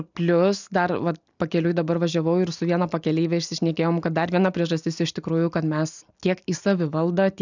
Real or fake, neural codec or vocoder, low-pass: real; none; 7.2 kHz